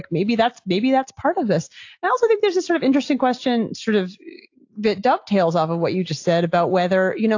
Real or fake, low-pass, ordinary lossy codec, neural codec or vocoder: real; 7.2 kHz; AAC, 48 kbps; none